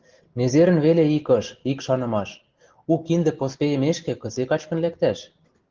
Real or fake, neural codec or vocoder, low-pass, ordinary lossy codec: real; none; 7.2 kHz; Opus, 16 kbps